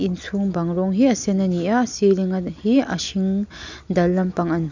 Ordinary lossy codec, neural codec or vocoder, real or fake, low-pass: none; none; real; 7.2 kHz